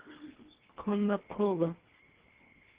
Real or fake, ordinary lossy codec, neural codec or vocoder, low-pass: fake; Opus, 16 kbps; codec, 24 kHz, 1.5 kbps, HILCodec; 3.6 kHz